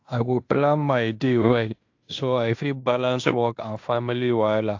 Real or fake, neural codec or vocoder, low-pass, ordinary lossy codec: fake; codec, 16 kHz in and 24 kHz out, 0.9 kbps, LongCat-Audio-Codec, fine tuned four codebook decoder; 7.2 kHz; AAC, 48 kbps